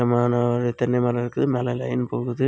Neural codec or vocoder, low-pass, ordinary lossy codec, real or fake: none; none; none; real